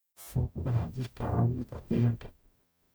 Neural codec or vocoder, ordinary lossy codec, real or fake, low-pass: codec, 44.1 kHz, 0.9 kbps, DAC; none; fake; none